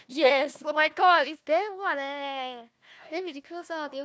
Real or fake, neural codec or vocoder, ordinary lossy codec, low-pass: fake; codec, 16 kHz, 1 kbps, FunCodec, trained on Chinese and English, 50 frames a second; none; none